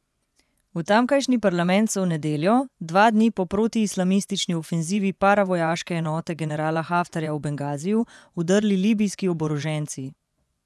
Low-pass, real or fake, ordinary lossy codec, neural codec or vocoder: none; fake; none; vocoder, 24 kHz, 100 mel bands, Vocos